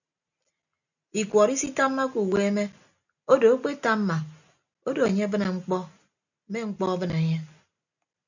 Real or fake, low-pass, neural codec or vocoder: real; 7.2 kHz; none